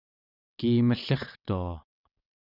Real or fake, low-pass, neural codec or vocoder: fake; 5.4 kHz; vocoder, 22.05 kHz, 80 mel bands, WaveNeXt